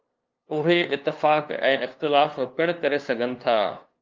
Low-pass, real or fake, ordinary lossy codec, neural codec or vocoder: 7.2 kHz; fake; Opus, 32 kbps; codec, 16 kHz, 2 kbps, FunCodec, trained on LibriTTS, 25 frames a second